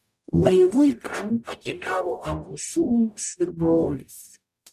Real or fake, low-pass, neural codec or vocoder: fake; 14.4 kHz; codec, 44.1 kHz, 0.9 kbps, DAC